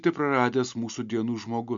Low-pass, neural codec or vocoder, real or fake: 7.2 kHz; none; real